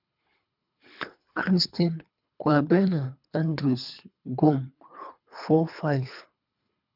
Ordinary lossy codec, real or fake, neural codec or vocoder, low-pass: none; fake; codec, 24 kHz, 3 kbps, HILCodec; 5.4 kHz